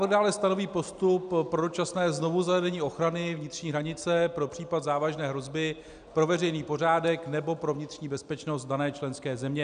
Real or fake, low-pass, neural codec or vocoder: real; 9.9 kHz; none